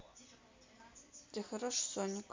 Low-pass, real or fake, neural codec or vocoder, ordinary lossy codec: 7.2 kHz; real; none; MP3, 64 kbps